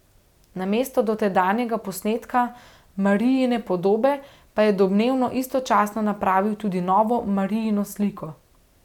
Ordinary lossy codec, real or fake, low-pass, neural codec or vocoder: none; real; 19.8 kHz; none